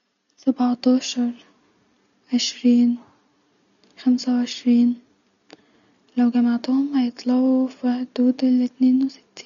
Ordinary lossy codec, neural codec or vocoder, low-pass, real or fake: none; none; 7.2 kHz; real